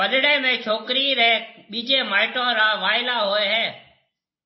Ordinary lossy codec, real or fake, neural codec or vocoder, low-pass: MP3, 24 kbps; real; none; 7.2 kHz